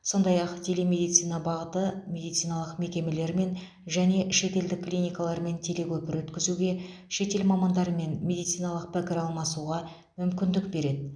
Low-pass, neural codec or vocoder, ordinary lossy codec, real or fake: 9.9 kHz; none; none; real